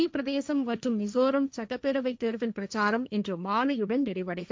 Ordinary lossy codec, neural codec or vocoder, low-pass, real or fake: none; codec, 16 kHz, 1.1 kbps, Voila-Tokenizer; none; fake